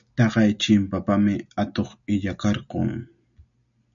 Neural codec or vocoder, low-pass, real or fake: none; 7.2 kHz; real